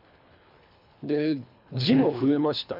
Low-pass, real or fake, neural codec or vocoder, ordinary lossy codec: 5.4 kHz; fake; codec, 24 kHz, 3 kbps, HILCodec; MP3, 48 kbps